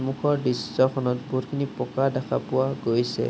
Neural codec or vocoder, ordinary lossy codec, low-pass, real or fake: none; none; none; real